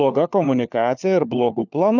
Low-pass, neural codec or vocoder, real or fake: 7.2 kHz; codec, 16 kHz, 4 kbps, FunCodec, trained on LibriTTS, 50 frames a second; fake